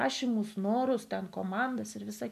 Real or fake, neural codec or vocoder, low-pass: real; none; 14.4 kHz